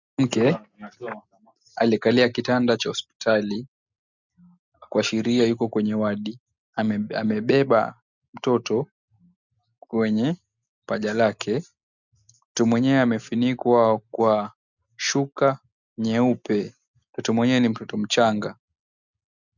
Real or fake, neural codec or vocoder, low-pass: real; none; 7.2 kHz